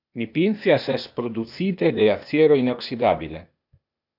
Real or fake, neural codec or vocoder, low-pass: fake; codec, 16 kHz, 0.8 kbps, ZipCodec; 5.4 kHz